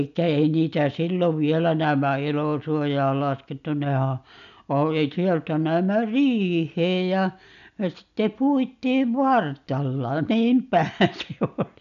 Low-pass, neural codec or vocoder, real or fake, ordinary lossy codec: 7.2 kHz; none; real; none